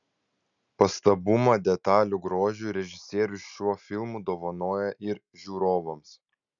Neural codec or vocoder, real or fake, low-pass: none; real; 7.2 kHz